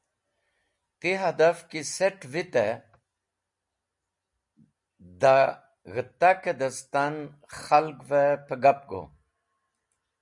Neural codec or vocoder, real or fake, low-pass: none; real; 10.8 kHz